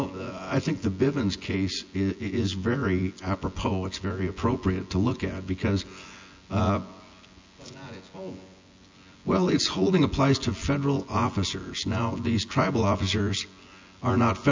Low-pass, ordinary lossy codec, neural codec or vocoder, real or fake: 7.2 kHz; MP3, 64 kbps; vocoder, 24 kHz, 100 mel bands, Vocos; fake